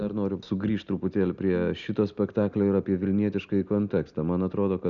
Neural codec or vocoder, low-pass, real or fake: none; 7.2 kHz; real